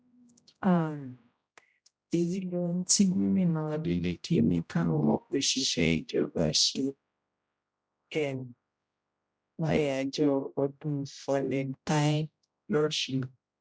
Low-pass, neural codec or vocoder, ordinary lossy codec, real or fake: none; codec, 16 kHz, 0.5 kbps, X-Codec, HuBERT features, trained on general audio; none; fake